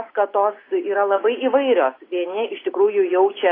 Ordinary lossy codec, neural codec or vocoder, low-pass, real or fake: AAC, 24 kbps; none; 5.4 kHz; real